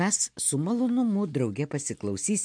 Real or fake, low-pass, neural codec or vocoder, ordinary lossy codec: real; 9.9 kHz; none; MP3, 64 kbps